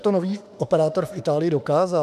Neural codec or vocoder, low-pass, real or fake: autoencoder, 48 kHz, 32 numbers a frame, DAC-VAE, trained on Japanese speech; 14.4 kHz; fake